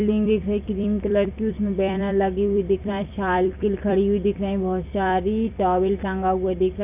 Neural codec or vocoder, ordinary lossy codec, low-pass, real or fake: vocoder, 44.1 kHz, 128 mel bands every 256 samples, BigVGAN v2; none; 3.6 kHz; fake